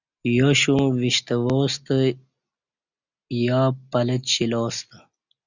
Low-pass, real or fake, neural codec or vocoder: 7.2 kHz; real; none